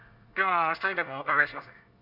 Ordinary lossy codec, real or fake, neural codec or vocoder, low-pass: none; fake; codec, 24 kHz, 1 kbps, SNAC; 5.4 kHz